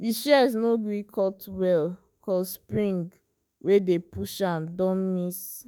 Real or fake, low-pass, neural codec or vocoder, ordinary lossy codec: fake; none; autoencoder, 48 kHz, 32 numbers a frame, DAC-VAE, trained on Japanese speech; none